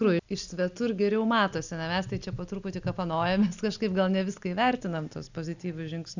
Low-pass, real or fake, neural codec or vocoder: 7.2 kHz; real; none